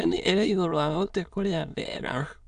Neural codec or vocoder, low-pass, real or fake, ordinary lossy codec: autoencoder, 22.05 kHz, a latent of 192 numbers a frame, VITS, trained on many speakers; 9.9 kHz; fake; none